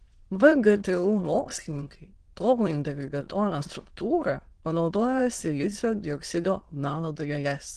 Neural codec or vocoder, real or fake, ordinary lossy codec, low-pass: autoencoder, 22.05 kHz, a latent of 192 numbers a frame, VITS, trained on many speakers; fake; Opus, 16 kbps; 9.9 kHz